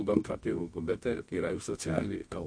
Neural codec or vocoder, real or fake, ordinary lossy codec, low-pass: codec, 24 kHz, 0.9 kbps, WavTokenizer, medium music audio release; fake; MP3, 48 kbps; 9.9 kHz